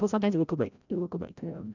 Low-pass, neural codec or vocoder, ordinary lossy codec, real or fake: 7.2 kHz; codec, 16 kHz, 0.5 kbps, FreqCodec, larger model; none; fake